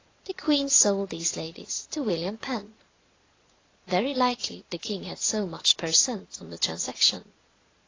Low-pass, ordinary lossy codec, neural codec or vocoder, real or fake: 7.2 kHz; AAC, 32 kbps; vocoder, 22.05 kHz, 80 mel bands, Vocos; fake